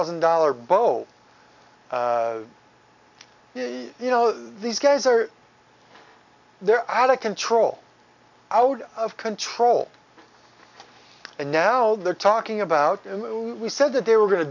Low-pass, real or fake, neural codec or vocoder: 7.2 kHz; real; none